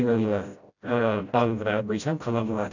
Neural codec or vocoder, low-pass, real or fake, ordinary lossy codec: codec, 16 kHz, 0.5 kbps, FreqCodec, smaller model; 7.2 kHz; fake; none